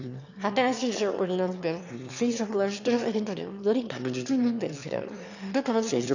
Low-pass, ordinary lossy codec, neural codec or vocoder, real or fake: 7.2 kHz; none; autoencoder, 22.05 kHz, a latent of 192 numbers a frame, VITS, trained on one speaker; fake